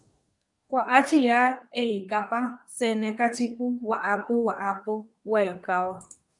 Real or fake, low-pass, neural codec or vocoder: fake; 10.8 kHz; codec, 24 kHz, 1 kbps, SNAC